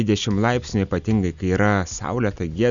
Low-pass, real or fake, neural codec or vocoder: 7.2 kHz; real; none